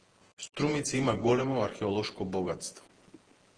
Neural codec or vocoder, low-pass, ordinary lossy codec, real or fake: vocoder, 48 kHz, 128 mel bands, Vocos; 9.9 kHz; Opus, 16 kbps; fake